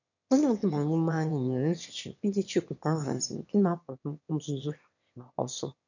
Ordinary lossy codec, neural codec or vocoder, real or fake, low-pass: none; autoencoder, 22.05 kHz, a latent of 192 numbers a frame, VITS, trained on one speaker; fake; 7.2 kHz